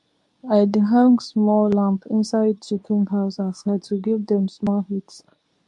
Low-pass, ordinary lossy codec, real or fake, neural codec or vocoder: 10.8 kHz; AAC, 64 kbps; fake; codec, 24 kHz, 0.9 kbps, WavTokenizer, medium speech release version 2